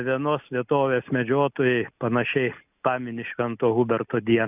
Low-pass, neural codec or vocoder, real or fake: 3.6 kHz; none; real